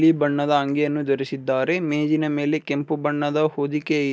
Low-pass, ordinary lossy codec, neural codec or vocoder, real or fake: none; none; none; real